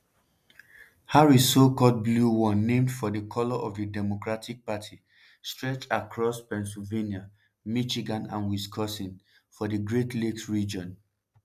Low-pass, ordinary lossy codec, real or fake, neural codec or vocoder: 14.4 kHz; none; real; none